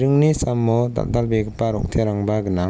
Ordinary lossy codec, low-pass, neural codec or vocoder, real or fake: none; none; none; real